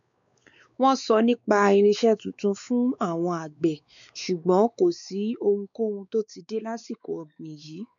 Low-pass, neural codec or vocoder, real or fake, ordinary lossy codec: 7.2 kHz; codec, 16 kHz, 4 kbps, X-Codec, WavLM features, trained on Multilingual LibriSpeech; fake; none